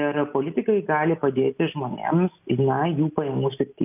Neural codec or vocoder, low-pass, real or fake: none; 3.6 kHz; real